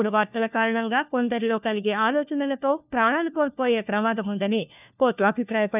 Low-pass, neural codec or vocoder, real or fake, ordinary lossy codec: 3.6 kHz; codec, 16 kHz, 1 kbps, FunCodec, trained on LibriTTS, 50 frames a second; fake; none